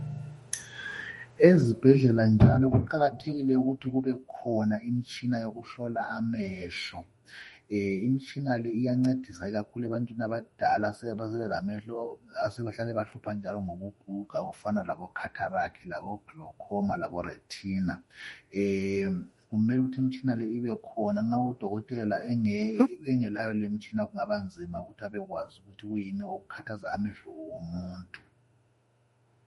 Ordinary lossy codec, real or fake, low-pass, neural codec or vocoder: MP3, 48 kbps; fake; 19.8 kHz; autoencoder, 48 kHz, 32 numbers a frame, DAC-VAE, trained on Japanese speech